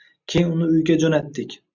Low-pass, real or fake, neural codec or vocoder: 7.2 kHz; real; none